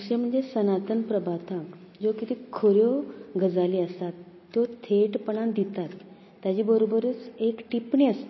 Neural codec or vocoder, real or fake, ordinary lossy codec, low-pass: none; real; MP3, 24 kbps; 7.2 kHz